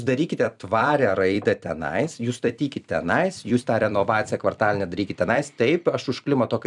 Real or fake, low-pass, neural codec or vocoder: fake; 10.8 kHz; vocoder, 44.1 kHz, 128 mel bands every 256 samples, BigVGAN v2